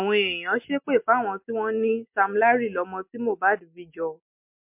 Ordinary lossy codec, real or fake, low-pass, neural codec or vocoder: MP3, 32 kbps; real; 3.6 kHz; none